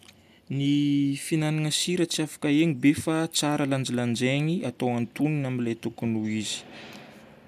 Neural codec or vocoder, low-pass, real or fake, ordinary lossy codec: none; 14.4 kHz; real; none